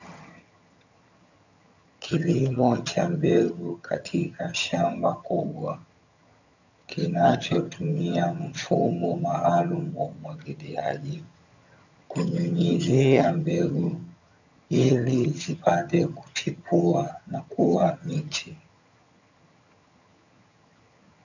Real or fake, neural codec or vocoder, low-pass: fake; vocoder, 22.05 kHz, 80 mel bands, HiFi-GAN; 7.2 kHz